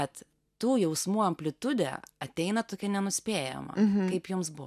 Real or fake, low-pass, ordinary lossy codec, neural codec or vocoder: real; 14.4 kHz; MP3, 96 kbps; none